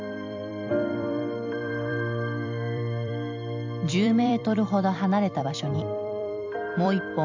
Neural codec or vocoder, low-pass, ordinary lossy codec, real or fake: vocoder, 44.1 kHz, 128 mel bands every 256 samples, BigVGAN v2; 7.2 kHz; MP3, 64 kbps; fake